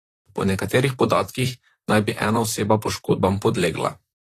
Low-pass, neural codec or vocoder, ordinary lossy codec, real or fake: 14.4 kHz; vocoder, 44.1 kHz, 128 mel bands, Pupu-Vocoder; AAC, 48 kbps; fake